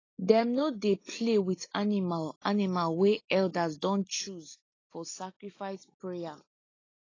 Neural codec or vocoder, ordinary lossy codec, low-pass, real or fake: none; AAC, 32 kbps; 7.2 kHz; real